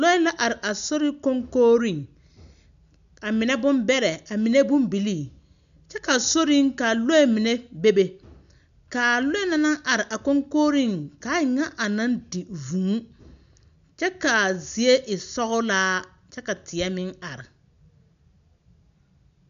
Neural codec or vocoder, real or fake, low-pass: none; real; 7.2 kHz